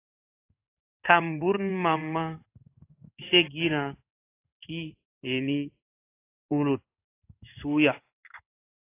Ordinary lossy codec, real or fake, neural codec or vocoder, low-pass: AAC, 24 kbps; fake; codec, 16 kHz in and 24 kHz out, 1 kbps, XY-Tokenizer; 3.6 kHz